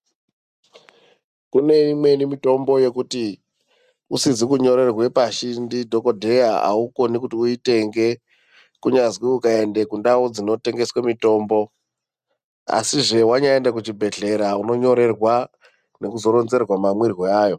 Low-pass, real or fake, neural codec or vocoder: 14.4 kHz; real; none